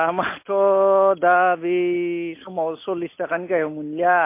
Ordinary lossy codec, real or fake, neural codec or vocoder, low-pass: MP3, 24 kbps; real; none; 3.6 kHz